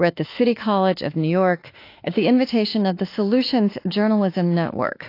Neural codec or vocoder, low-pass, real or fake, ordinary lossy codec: autoencoder, 48 kHz, 32 numbers a frame, DAC-VAE, trained on Japanese speech; 5.4 kHz; fake; AAC, 32 kbps